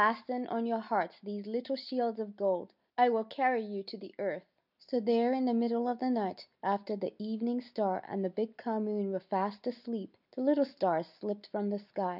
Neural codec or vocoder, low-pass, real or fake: none; 5.4 kHz; real